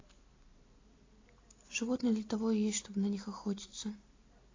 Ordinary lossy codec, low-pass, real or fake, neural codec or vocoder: AAC, 32 kbps; 7.2 kHz; real; none